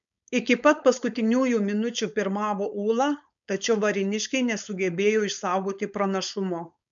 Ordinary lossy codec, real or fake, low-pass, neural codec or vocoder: MP3, 96 kbps; fake; 7.2 kHz; codec, 16 kHz, 4.8 kbps, FACodec